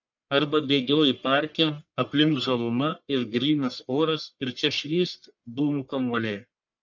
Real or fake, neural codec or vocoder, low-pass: fake; codec, 44.1 kHz, 1.7 kbps, Pupu-Codec; 7.2 kHz